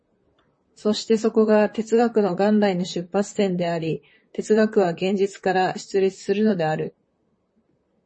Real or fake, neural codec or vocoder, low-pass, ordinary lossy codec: fake; vocoder, 44.1 kHz, 128 mel bands, Pupu-Vocoder; 9.9 kHz; MP3, 32 kbps